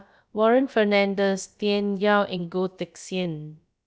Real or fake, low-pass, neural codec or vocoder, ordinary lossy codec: fake; none; codec, 16 kHz, about 1 kbps, DyCAST, with the encoder's durations; none